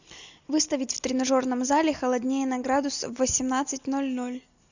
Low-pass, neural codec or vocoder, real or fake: 7.2 kHz; none; real